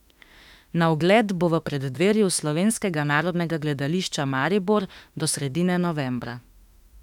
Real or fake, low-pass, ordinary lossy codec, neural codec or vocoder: fake; 19.8 kHz; none; autoencoder, 48 kHz, 32 numbers a frame, DAC-VAE, trained on Japanese speech